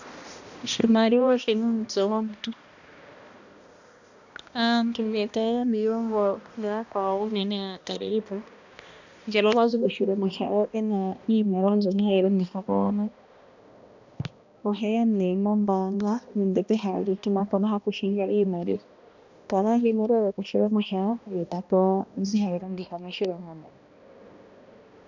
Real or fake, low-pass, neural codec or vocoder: fake; 7.2 kHz; codec, 16 kHz, 1 kbps, X-Codec, HuBERT features, trained on balanced general audio